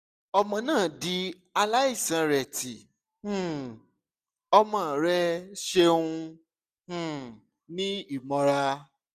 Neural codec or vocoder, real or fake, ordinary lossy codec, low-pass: none; real; none; 14.4 kHz